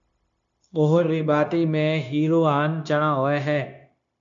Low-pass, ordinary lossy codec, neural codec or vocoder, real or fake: 7.2 kHz; AAC, 64 kbps; codec, 16 kHz, 0.9 kbps, LongCat-Audio-Codec; fake